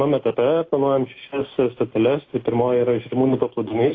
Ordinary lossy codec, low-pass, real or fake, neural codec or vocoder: AAC, 32 kbps; 7.2 kHz; real; none